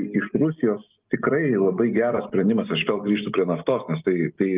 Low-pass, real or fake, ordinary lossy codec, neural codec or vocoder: 3.6 kHz; real; Opus, 32 kbps; none